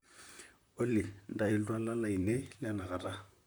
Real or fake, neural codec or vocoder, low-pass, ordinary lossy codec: fake; vocoder, 44.1 kHz, 128 mel bands, Pupu-Vocoder; none; none